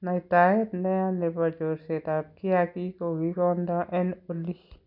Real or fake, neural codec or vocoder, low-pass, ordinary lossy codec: real; none; 5.4 kHz; none